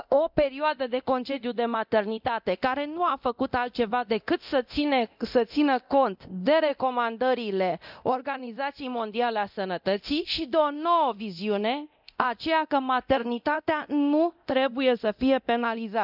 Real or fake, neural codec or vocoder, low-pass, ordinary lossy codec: fake; codec, 24 kHz, 0.9 kbps, DualCodec; 5.4 kHz; none